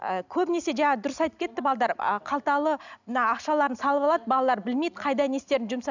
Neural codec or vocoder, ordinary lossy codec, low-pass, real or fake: none; none; 7.2 kHz; real